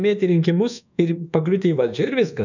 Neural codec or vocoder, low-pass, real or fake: codec, 24 kHz, 1.2 kbps, DualCodec; 7.2 kHz; fake